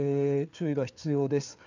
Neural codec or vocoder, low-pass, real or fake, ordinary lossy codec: codec, 16 kHz, 4 kbps, FreqCodec, larger model; 7.2 kHz; fake; none